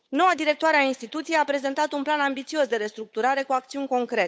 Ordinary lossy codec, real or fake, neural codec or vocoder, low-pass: none; fake; codec, 16 kHz, 8 kbps, FunCodec, trained on Chinese and English, 25 frames a second; none